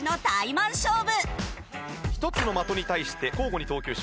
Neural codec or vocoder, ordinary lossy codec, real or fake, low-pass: none; none; real; none